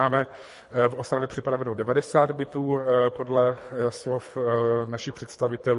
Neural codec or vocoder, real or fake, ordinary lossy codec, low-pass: codec, 24 kHz, 3 kbps, HILCodec; fake; MP3, 48 kbps; 10.8 kHz